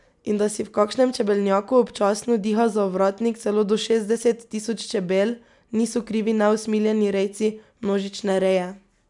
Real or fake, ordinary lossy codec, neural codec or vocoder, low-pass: real; none; none; 10.8 kHz